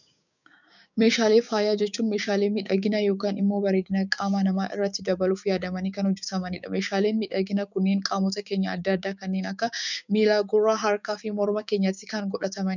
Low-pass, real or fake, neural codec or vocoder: 7.2 kHz; fake; codec, 16 kHz, 6 kbps, DAC